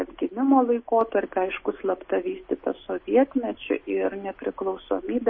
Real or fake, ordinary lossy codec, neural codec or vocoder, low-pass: real; MP3, 24 kbps; none; 7.2 kHz